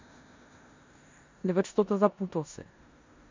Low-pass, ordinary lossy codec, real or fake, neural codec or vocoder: 7.2 kHz; MP3, 64 kbps; fake; codec, 16 kHz in and 24 kHz out, 0.9 kbps, LongCat-Audio-Codec, four codebook decoder